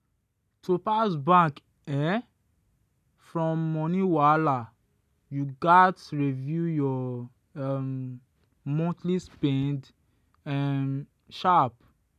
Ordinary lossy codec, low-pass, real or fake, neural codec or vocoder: none; 14.4 kHz; real; none